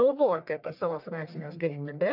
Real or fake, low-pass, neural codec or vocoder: fake; 5.4 kHz; codec, 44.1 kHz, 1.7 kbps, Pupu-Codec